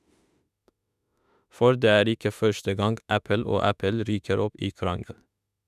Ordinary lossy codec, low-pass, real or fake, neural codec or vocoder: none; 14.4 kHz; fake; autoencoder, 48 kHz, 32 numbers a frame, DAC-VAE, trained on Japanese speech